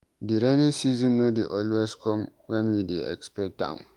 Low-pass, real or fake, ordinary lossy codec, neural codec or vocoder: 19.8 kHz; fake; Opus, 32 kbps; autoencoder, 48 kHz, 32 numbers a frame, DAC-VAE, trained on Japanese speech